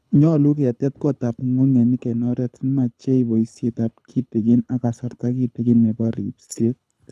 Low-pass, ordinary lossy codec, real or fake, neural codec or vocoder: none; none; fake; codec, 24 kHz, 6 kbps, HILCodec